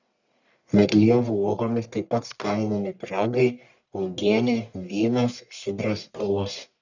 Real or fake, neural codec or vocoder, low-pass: fake; codec, 44.1 kHz, 1.7 kbps, Pupu-Codec; 7.2 kHz